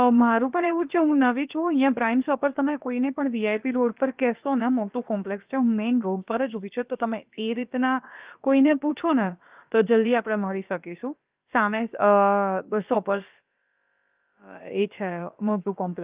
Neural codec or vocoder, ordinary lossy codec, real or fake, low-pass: codec, 16 kHz, about 1 kbps, DyCAST, with the encoder's durations; Opus, 24 kbps; fake; 3.6 kHz